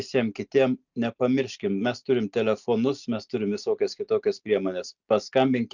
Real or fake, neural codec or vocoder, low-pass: real; none; 7.2 kHz